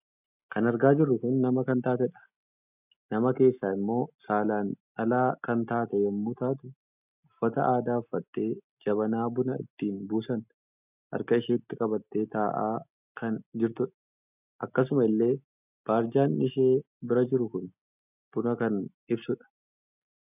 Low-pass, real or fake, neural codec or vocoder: 3.6 kHz; real; none